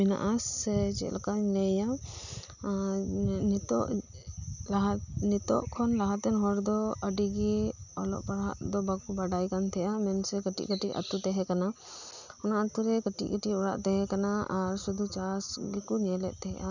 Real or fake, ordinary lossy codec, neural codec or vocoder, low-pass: real; none; none; 7.2 kHz